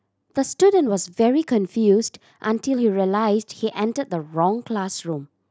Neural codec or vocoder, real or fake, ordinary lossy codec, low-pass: none; real; none; none